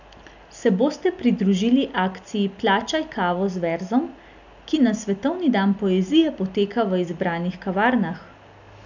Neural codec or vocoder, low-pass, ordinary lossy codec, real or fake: none; 7.2 kHz; none; real